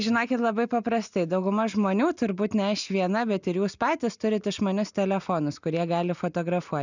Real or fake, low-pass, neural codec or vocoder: real; 7.2 kHz; none